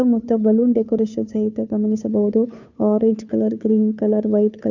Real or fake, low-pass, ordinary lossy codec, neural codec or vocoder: fake; 7.2 kHz; none; codec, 16 kHz, 2 kbps, FunCodec, trained on Chinese and English, 25 frames a second